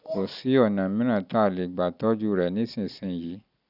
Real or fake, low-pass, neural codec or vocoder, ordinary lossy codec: real; 5.4 kHz; none; none